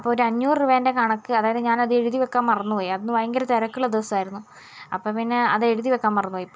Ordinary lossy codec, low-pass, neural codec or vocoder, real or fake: none; none; none; real